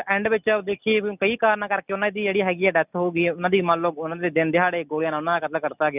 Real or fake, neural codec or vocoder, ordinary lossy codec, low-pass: real; none; none; 3.6 kHz